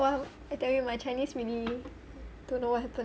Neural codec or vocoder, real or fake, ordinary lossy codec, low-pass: none; real; none; none